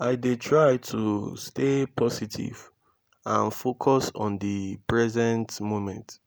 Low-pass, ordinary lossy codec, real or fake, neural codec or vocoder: none; none; real; none